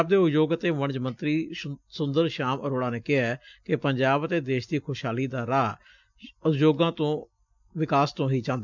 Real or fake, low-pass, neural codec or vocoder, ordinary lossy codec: real; 7.2 kHz; none; none